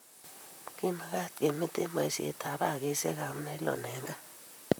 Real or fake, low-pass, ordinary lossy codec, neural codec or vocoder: fake; none; none; vocoder, 44.1 kHz, 128 mel bands, Pupu-Vocoder